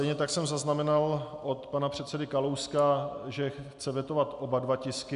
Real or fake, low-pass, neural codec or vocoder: real; 10.8 kHz; none